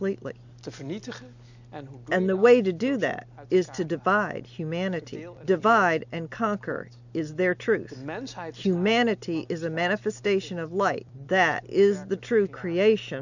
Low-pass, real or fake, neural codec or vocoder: 7.2 kHz; real; none